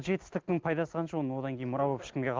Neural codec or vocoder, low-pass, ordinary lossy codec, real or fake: none; 7.2 kHz; Opus, 16 kbps; real